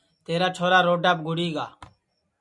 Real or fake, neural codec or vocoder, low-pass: real; none; 10.8 kHz